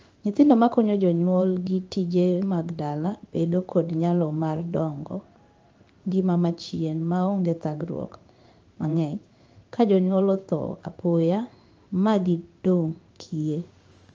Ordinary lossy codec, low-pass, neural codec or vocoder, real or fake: Opus, 24 kbps; 7.2 kHz; codec, 16 kHz in and 24 kHz out, 1 kbps, XY-Tokenizer; fake